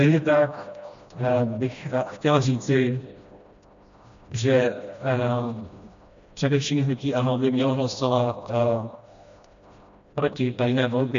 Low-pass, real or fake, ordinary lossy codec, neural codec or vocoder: 7.2 kHz; fake; MP3, 64 kbps; codec, 16 kHz, 1 kbps, FreqCodec, smaller model